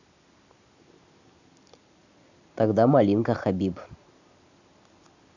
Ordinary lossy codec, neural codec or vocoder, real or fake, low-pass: none; none; real; 7.2 kHz